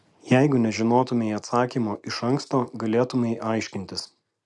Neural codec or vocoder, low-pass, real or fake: none; 10.8 kHz; real